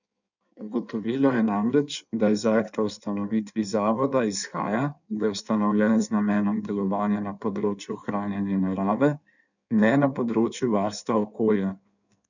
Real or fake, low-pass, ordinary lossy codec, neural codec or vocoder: fake; 7.2 kHz; none; codec, 16 kHz in and 24 kHz out, 1.1 kbps, FireRedTTS-2 codec